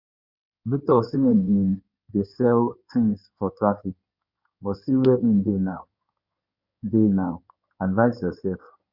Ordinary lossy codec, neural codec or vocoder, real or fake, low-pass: Opus, 64 kbps; codec, 16 kHz in and 24 kHz out, 2.2 kbps, FireRedTTS-2 codec; fake; 5.4 kHz